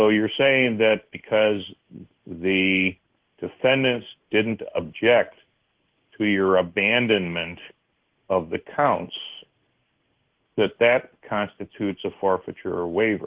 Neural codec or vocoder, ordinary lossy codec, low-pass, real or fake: codec, 16 kHz in and 24 kHz out, 1 kbps, XY-Tokenizer; Opus, 16 kbps; 3.6 kHz; fake